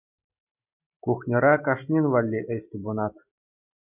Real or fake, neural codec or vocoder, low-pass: real; none; 3.6 kHz